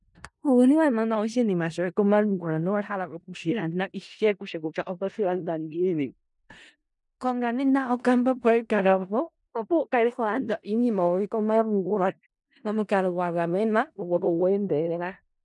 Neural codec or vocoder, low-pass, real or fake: codec, 16 kHz in and 24 kHz out, 0.4 kbps, LongCat-Audio-Codec, four codebook decoder; 10.8 kHz; fake